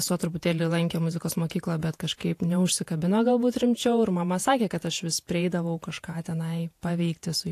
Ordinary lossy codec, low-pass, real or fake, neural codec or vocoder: AAC, 64 kbps; 14.4 kHz; fake; vocoder, 48 kHz, 128 mel bands, Vocos